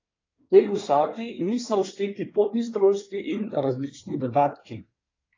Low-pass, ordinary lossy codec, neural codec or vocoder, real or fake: 7.2 kHz; AAC, 32 kbps; codec, 24 kHz, 1 kbps, SNAC; fake